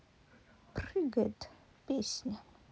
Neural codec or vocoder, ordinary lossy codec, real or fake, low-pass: none; none; real; none